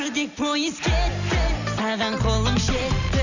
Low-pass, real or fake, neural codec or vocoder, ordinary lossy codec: 7.2 kHz; real; none; none